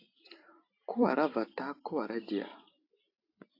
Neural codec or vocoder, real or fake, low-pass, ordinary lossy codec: vocoder, 44.1 kHz, 128 mel bands every 512 samples, BigVGAN v2; fake; 5.4 kHz; AAC, 32 kbps